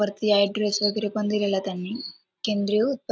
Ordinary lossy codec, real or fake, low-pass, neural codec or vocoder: none; real; none; none